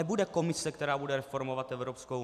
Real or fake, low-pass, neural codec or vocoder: real; 14.4 kHz; none